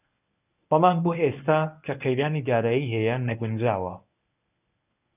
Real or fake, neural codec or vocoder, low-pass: fake; codec, 24 kHz, 0.9 kbps, WavTokenizer, medium speech release version 1; 3.6 kHz